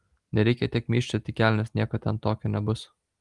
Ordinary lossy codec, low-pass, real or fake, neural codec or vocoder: Opus, 24 kbps; 10.8 kHz; real; none